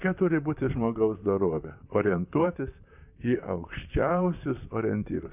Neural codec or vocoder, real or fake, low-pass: vocoder, 44.1 kHz, 128 mel bands, Pupu-Vocoder; fake; 3.6 kHz